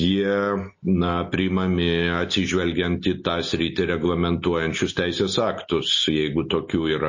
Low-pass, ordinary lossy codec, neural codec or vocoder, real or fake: 7.2 kHz; MP3, 32 kbps; none; real